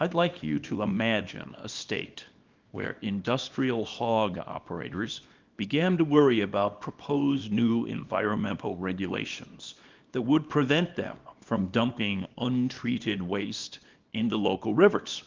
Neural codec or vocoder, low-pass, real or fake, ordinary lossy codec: codec, 24 kHz, 0.9 kbps, WavTokenizer, small release; 7.2 kHz; fake; Opus, 24 kbps